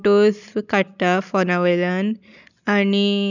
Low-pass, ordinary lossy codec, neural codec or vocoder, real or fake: 7.2 kHz; none; none; real